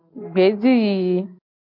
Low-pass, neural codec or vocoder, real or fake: 5.4 kHz; none; real